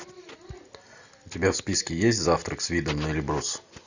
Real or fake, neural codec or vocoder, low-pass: real; none; 7.2 kHz